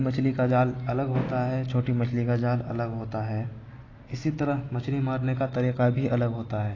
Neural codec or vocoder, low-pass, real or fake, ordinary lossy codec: none; 7.2 kHz; real; AAC, 32 kbps